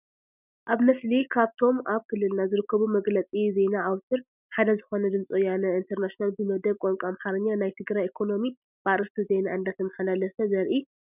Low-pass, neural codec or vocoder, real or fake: 3.6 kHz; none; real